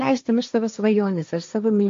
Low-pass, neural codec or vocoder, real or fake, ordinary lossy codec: 7.2 kHz; codec, 16 kHz, 1.1 kbps, Voila-Tokenizer; fake; MP3, 48 kbps